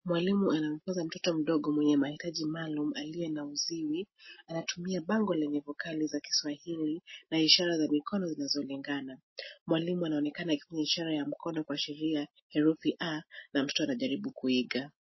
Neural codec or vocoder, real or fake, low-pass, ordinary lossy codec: none; real; 7.2 kHz; MP3, 24 kbps